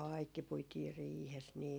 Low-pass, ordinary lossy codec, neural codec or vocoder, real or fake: none; none; none; real